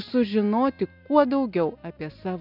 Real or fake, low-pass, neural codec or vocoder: real; 5.4 kHz; none